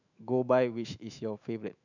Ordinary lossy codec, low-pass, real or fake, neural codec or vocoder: none; 7.2 kHz; fake; autoencoder, 48 kHz, 128 numbers a frame, DAC-VAE, trained on Japanese speech